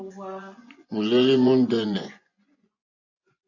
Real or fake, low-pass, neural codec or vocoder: fake; 7.2 kHz; vocoder, 24 kHz, 100 mel bands, Vocos